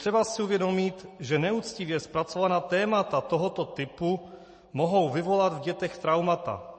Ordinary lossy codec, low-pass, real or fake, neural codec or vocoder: MP3, 32 kbps; 10.8 kHz; real; none